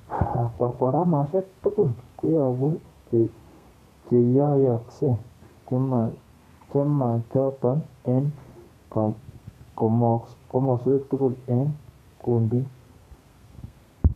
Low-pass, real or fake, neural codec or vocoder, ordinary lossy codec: 14.4 kHz; fake; codec, 32 kHz, 1.9 kbps, SNAC; MP3, 96 kbps